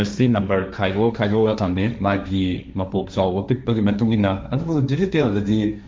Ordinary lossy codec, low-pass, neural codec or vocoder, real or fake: none; none; codec, 16 kHz, 1.1 kbps, Voila-Tokenizer; fake